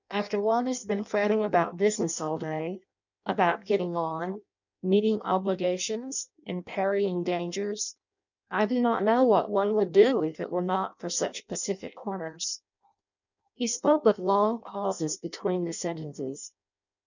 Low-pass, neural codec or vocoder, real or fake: 7.2 kHz; codec, 16 kHz in and 24 kHz out, 0.6 kbps, FireRedTTS-2 codec; fake